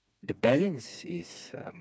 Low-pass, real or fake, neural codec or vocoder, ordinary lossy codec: none; fake; codec, 16 kHz, 2 kbps, FreqCodec, smaller model; none